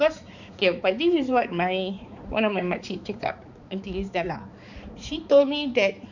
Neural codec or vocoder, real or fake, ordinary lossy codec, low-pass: codec, 16 kHz, 4 kbps, X-Codec, HuBERT features, trained on balanced general audio; fake; none; 7.2 kHz